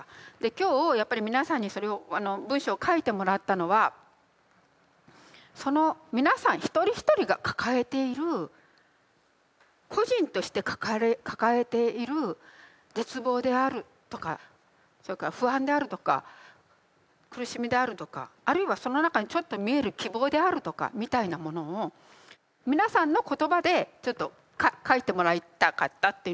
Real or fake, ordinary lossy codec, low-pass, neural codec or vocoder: real; none; none; none